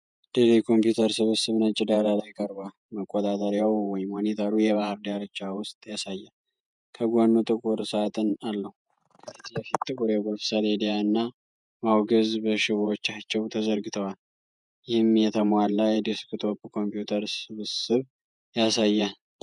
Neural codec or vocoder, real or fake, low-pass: vocoder, 48 kHz, 128 mel bands, Vocos; fake; 10.8 kHz